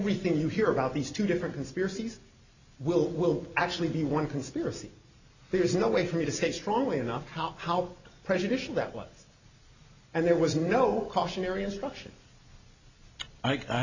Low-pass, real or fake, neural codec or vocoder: 7.2 kHz; real; none